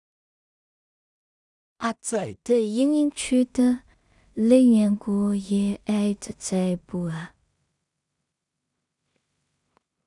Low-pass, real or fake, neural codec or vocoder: 10.8 kHz; fake; codec, 16 kHz in and 24 kHz out, 0.4 kbps, LongCat-Audio-Codec, two codebook decoder